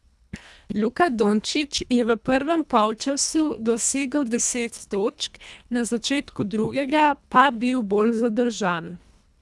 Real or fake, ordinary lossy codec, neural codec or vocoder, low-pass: fake; none; codec, 24 kHz, 1.5 kbps, HILCodec; none